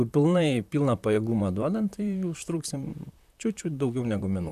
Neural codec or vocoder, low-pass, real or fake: vocoder, 44.1 kHz, 128 mel bands, Pupu-Vocoder; 14.4 kHz; fake